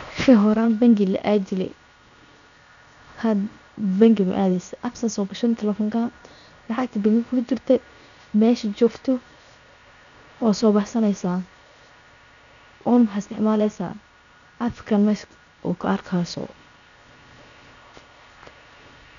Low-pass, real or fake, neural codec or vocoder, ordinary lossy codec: 7.2 kHz; fake; codec, 16 kHz, 0.7 kbps, FocalCodec; none